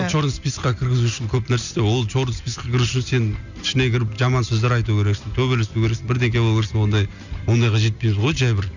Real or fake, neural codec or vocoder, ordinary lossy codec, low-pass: real; none; none; 7.2 kHz